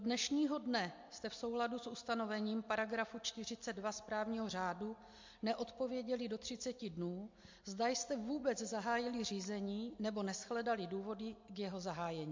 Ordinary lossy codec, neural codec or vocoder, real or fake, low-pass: MP3, 48 kbps; none; real; 7.2 kHz